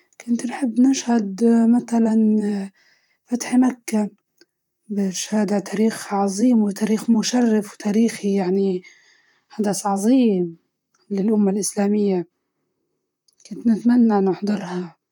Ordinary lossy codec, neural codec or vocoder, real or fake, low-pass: none; vocoder, 44.1 kHz, 128 mel bands, Pupu-Vocoder; fake; 19.8 kHz